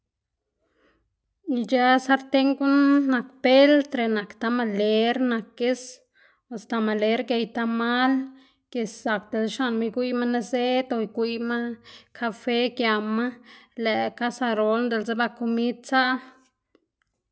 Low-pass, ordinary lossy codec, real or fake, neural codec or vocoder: none; none; real; none